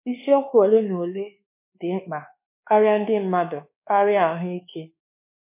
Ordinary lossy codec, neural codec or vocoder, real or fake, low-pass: MP3, 24 kbps; codec, 24 kHz, 1.2 kbps, DualCodec; fake; 3.6 kHz